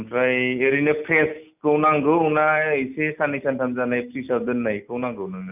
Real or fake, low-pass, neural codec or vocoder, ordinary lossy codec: real; 3.6 kHz; none; none